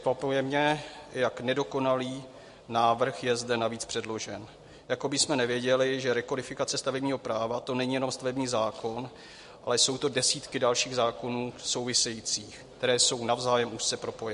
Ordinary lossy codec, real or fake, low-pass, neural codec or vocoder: MP3, 48 kbps; real; 10.8 kHz; none